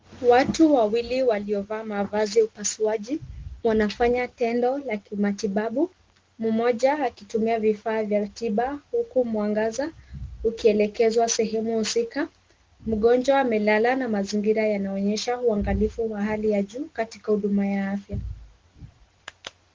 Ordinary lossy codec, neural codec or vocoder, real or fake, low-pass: Opus, 16 kbps; none; real; 7.2 kHz